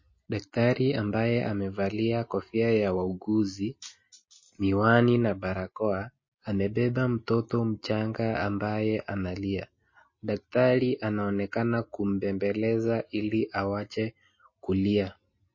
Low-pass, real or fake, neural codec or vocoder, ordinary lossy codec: 7.2 kHz; real; none; MP3, 32 kbps